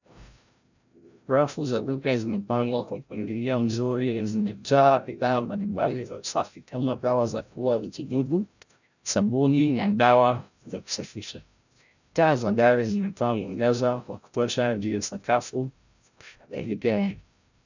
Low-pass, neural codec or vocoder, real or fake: 7.2 kHz; codec, 16 kHz, 0.5 kbps, FreqCodec, larger model; fake